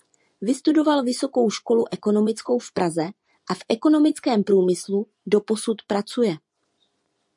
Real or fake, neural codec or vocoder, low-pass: fake; vocoder, 24 kHz, 100 mel bands, Vocos; 10.8 kHz